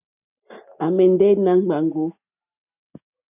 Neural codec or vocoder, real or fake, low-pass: none; real; 3.6 kHz